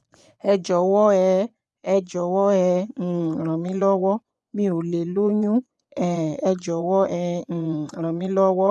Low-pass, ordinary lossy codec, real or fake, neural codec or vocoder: none; none; fake; vocoder, 24 kHz, 100 mel bands, Vocos